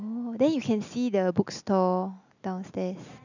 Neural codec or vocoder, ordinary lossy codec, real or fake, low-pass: none; none; real; 7.2 kHz